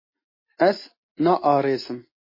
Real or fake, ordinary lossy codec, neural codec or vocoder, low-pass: real; MP3, 24 kbps; none; 5.4 kHz